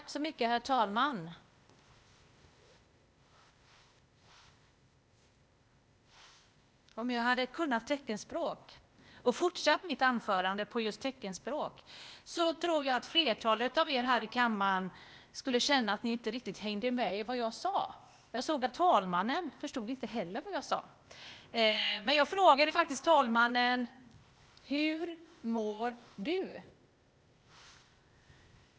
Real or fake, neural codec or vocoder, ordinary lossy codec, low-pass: fake; codec, 16 kHz, 0.8 kbps, ZipCodec; none; none